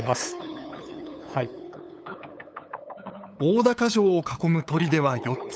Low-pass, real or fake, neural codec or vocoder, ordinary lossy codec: none; fake; codec, 16 kHz, 8 kbps, FunCodec, trained on LibriTTS, 25 frames a second; none